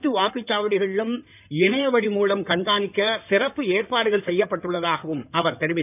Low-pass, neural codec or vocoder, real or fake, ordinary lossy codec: 3.6 kHz; codec, 16 kHz in and 24 kHz out, 2.2 kbps, FireRedTTS-2 codec; fake; none